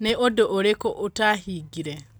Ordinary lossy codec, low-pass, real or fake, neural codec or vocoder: none; none; real; none